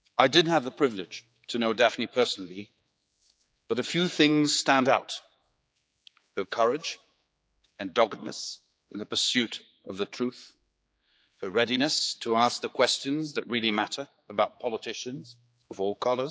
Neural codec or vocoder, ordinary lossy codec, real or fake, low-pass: codec, 16 kHz, 4 kbps, X-Codec, HuBERT features, trained on general audio; none; fake; none